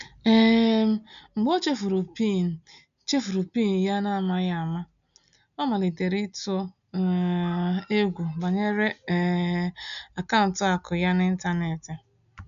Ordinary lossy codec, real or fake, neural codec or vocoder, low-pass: none; real; none; 7.2 kHz